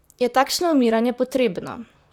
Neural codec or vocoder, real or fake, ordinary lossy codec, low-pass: vocoder, 44.1 kHz, 128 mel bands, Pupu-Vocoder; fake; none; 19.8 kHz